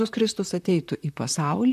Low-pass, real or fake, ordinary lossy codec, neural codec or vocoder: 14.4 kHz; fake; MP3, 96 kbps; vocoder, 44.1 kHz, 128 mel bands, Pupu-Vocoder